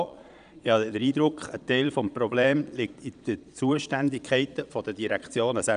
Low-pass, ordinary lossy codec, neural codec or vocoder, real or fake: 9.9 kHz; none; vocoder, 22.05 kHz, 80 mel bands, Vocos; fake